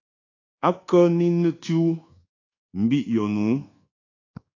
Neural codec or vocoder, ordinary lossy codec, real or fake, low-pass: codec, 24 kHz, 1.2 kbps, DualCodec; AAC, 32 kbps; fake; 7.2 kHz